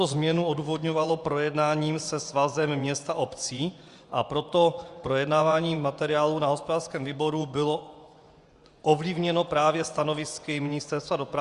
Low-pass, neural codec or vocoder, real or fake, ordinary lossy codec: 10.8 kHz; vocoder, 24 kHz, 100 mel bands, Vocos; fake; Opus, 64 kbps